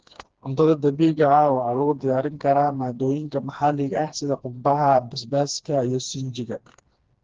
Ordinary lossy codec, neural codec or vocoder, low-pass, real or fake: Opus, 16 kbps; codec, 16 kHz, 2 kbps, FreqCodec, smaller model; 7.2 kHz; fake